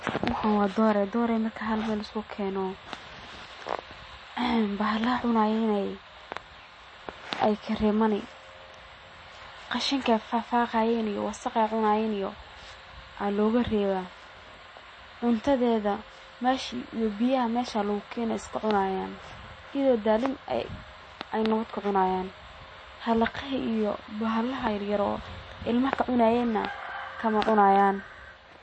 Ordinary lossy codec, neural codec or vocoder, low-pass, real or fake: MP3, 32 kbps; none; 9.9 kHz; real